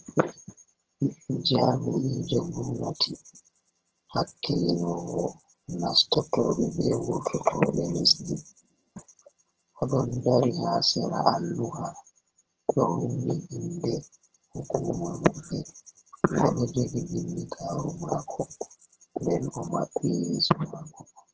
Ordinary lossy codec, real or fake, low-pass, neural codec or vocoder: Opus, 16 kbps; fake; 7.2 kHz; vocoder, 22.05 kHz, 80 mel bands, HiFi-GAN